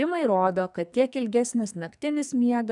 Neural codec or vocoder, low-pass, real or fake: codec, 44.1 kHz, 2.6 kbps, SNAC; 10.8 kHz; fake